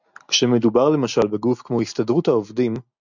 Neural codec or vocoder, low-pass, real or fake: none; 7.2 kHz; real